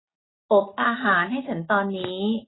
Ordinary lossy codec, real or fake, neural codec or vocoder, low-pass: AAC, 16 kbps; real; none; 7.2 kHz